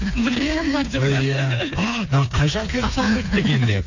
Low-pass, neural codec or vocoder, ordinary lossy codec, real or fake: 7.2 kHz; codec, 16 kHz, 4 kbps, FreqCodec, smaller model; AAC, 48 kbps; fake